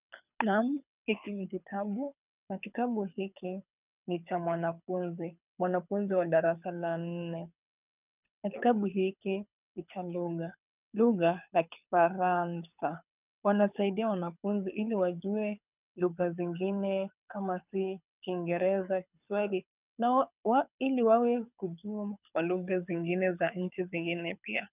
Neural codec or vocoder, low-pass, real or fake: codec, 24 kHz, 6 kbps, HILCodec; 3.6 kHz; fake